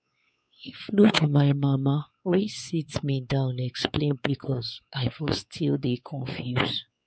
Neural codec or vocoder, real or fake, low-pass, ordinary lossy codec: codec, 16 kHz, 4 kbps, X-Codec, WavLM features, trained on Multilingual LibriSpeech; fake; none; none